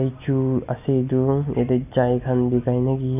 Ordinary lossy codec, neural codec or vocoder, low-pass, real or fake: none; none; 3.6 kHz; real